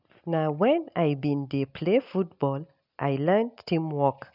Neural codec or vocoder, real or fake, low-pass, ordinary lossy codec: none; real; 5.4 kHz; none